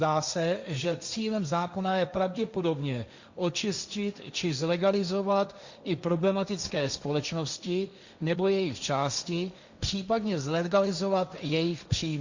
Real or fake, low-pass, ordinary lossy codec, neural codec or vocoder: fake; 7.2 kHz; Opus, 64 kbps; codec, 16 kHz, 1.1 kbps, Voila-Tokenizer